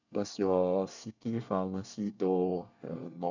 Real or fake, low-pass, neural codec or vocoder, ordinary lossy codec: fake; 7.2 kHz; codec, 24 kHz, 1 kbps, SNAC; none